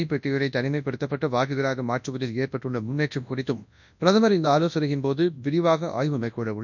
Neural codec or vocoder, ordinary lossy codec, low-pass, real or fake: codec, 24 kHz, 0.9 kbps, WavTokenizer, large speech release; none; 7.2 kHz; fake